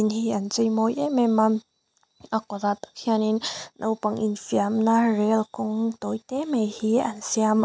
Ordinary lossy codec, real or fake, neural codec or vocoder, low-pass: none; real; none; none